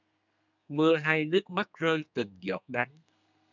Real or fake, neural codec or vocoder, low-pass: fake; codec, 32 kHz, 1.9 kbps, SNAC; 7.2 kHz